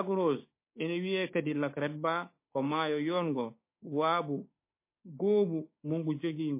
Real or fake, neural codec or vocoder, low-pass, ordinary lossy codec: fake; codec, 16 kHz, 6 kbps, DAC; 3.6 kHz; MP3, 24 kbps